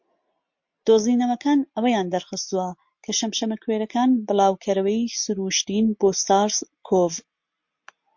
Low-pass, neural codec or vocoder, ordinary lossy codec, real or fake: 7.2 kHz; none; MP3, 48 kbps; real